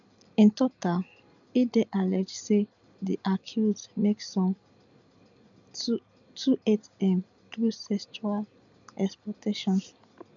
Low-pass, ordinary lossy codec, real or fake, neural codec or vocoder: 7.2 kHz; none; real; none